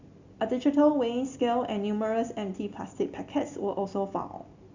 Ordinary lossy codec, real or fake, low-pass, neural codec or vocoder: Opus, 64 kbps; real; 7.2 kHz; none